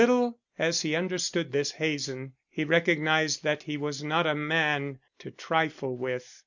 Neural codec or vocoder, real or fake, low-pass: none; real; 7.2 kHz